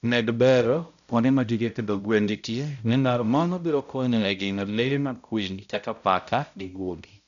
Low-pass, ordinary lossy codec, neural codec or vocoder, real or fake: 7.2 kHz; none; codec, 16 kHz, 0.5 kbps, X-Codec, HuBERT features, trained on balanced general audio; fake